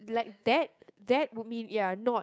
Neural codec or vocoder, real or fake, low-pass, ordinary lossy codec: codec, 16 kHz, 6 kbps, DAC; fake; none; none